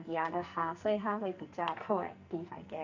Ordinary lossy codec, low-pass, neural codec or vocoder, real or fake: AAC, 48 kbps; 7.2 kHz; codec, 44.1 kHz, 2.6 kbps, SNAC; fake